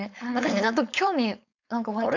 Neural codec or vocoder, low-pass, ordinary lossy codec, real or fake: codec, 16 kHz, 4.8 kbps, FACodec; 7.2 kHz; none; fake